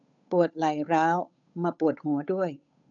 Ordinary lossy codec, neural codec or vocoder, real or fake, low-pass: none; codec, 16 kHz, 8 kbps, FunCodec, trained on Chinese and English, 25 frames a second; fake; 7.2 kHz